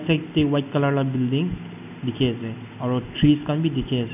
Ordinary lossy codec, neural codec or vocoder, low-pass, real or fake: none; none; 3.6 kHz; real